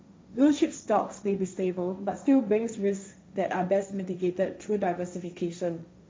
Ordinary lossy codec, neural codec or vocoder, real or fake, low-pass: none; codec, 16 kHz, 1.1 kbps, Voila-Tokenizer; fake; none